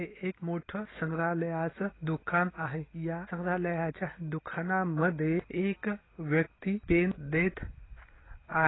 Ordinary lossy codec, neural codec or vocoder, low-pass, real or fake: AAC, 16 kbps; none; 7.2 kHz; real